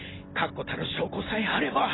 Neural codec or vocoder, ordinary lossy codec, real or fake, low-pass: none; AAC, 16 kbps; real; 7.2 kHz